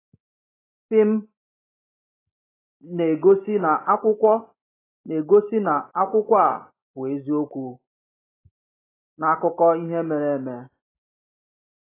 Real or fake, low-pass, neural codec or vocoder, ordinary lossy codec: real; 3.6 kHz; none; AAC, 16 kbps